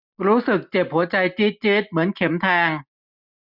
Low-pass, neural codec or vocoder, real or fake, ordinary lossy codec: 5.4 kHz; none; real; none